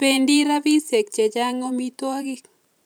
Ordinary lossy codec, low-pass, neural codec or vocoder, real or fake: none; none; vocoder, 44.1 kHz, 128 mel bands every 512 samples, BigVGAN v2; fake